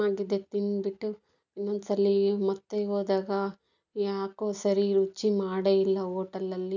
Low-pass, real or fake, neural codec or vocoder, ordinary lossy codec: 7.2 kHz; real; none; none